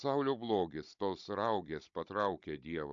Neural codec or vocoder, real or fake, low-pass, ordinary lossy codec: codec, 16 kHz, 16 kbps, FunCodec, trained on Chinese and English, 50 frames a second; fake; 7.2 kHz; AAC, 64 kbps